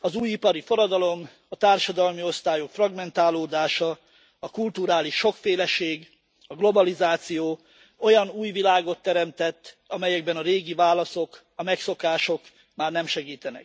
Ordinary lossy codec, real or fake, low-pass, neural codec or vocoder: none; real; none; none